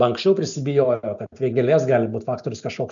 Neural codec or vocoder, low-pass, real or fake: none; 7.2 kHz; real